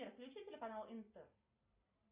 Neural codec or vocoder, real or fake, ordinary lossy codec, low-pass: none; real; Opus, 64 kbps; 3.6 kHz